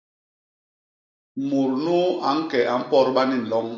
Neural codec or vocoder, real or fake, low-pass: none; real; 7.2 kHz